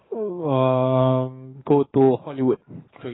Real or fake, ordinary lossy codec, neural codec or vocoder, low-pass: fake; AAC, 16 kbps; codec, 44.1 kHz, 7.8 kbps, DAC; 7.2 kHz